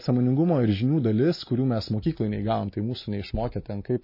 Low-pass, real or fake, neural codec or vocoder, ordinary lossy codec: 5.4 kHz; real; none; MP3, 24 kbps